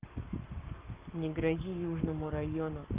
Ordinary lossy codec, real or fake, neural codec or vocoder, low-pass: Opus, 32 kbps; fake; codec, 44.1 kHz, 7.8 kbps, Pupu-Codec; 3.6 kHz